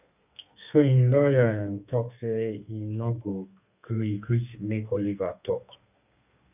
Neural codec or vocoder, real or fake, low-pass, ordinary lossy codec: codec, 32 kHz, 1.9 kbps, SNAC; fake; 3.6 kHz; none